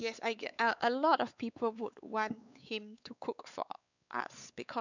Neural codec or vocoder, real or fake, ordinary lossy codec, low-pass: codec, 16 kHz, 4 kbps, X-Codec, WavLM features, trained on Multilingual LibriSpeech; fake; none; 7.2 kHz